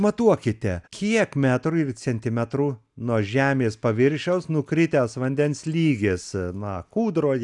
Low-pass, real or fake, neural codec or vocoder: 10.8 kHz; real; none